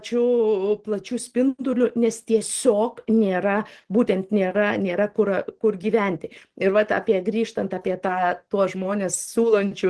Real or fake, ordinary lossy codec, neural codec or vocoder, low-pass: real; Opus, 16 kbps; none; 10.8 kHz